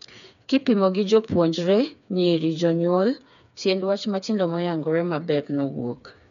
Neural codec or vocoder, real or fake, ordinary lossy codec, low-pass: codec, 16 kHz, 4 kbps, FreqCodec, smaller model; fake; none; 7.2 kHz